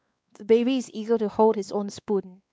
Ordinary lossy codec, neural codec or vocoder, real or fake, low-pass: none; codec, 16 kHz, 2 kbps, X-Codec, WavLM features, trained on Multilingual LibriSpeech; fake; none